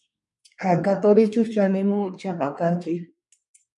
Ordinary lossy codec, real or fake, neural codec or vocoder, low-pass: MP3, 64 kbps; fake; codec, 24 kHz, 1 kbps, SNAC; 10.8 kHz